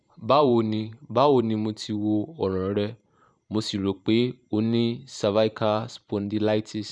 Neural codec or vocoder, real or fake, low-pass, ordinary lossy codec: vocoder, 44.1 kHz, 128 mel bands every 512 samples, BigVGAN v2; fake; 9.9 kHz; none